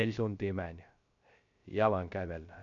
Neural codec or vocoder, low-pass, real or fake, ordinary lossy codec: codec, 16 kHz, 0.3 kbps, FocalCodec; 7.2 kHz; fake; MP3, 48 kbps